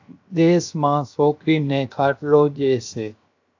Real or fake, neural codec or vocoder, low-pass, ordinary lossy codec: fake; codec, 16 kHz, 0.7 kbps, FocalCodec; 7.2 kHz; AAC, 48 kbps